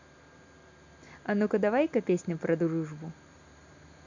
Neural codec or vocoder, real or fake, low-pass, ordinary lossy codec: none; real; 7.2 kHz; none